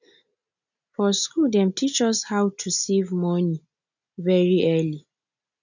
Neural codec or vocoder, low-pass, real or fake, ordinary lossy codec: none; 7.2 kHz; real; none